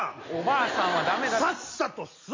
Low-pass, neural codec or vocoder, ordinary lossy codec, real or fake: 7.2 kHz; none; MP3, 32 kbps; real